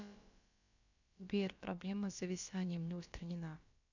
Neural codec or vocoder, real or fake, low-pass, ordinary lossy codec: codec, 16 kHz, about 1 kbps, DyCAST, with the encoder's durations; fake; 7.2 kHz; none